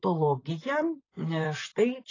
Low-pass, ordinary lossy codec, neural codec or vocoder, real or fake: 7.2 kHz; AAC, 32 kbps; vocoder, 44.1 kHz, 128 mel bands, Pupu-Vocoder; fake